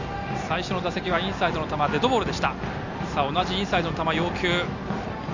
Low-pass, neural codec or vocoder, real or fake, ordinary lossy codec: 7.2 kHz; none; real; none